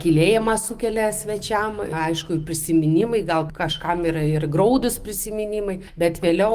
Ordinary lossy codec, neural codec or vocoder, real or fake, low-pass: Opus, 24 kbps; autoencoder, 48 kHz, 128 numbers a frame, DAC-VAE, trained on Japanese speech; fake; 14.4 kHz